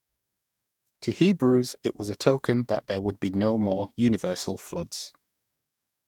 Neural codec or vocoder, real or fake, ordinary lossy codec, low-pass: codec, 44.1 kHz, 2.6 kbps, DAC; fake; none; 19.8 kHz